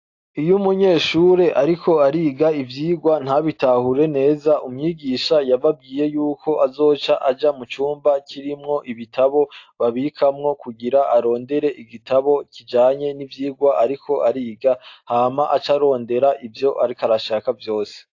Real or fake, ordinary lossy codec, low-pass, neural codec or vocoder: real; AAC, 48 kbps; 7.2 kHz; none